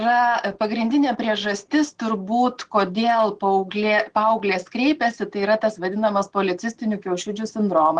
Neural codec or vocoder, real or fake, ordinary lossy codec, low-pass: none; real; Opus, 16 kbps; 9.9 kHz